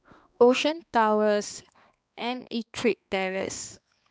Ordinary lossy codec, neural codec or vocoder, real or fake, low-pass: none; codec, 16 kHz, 2 kbps, X-Codec, HuBERT features, trained on balanced general audio; fake; none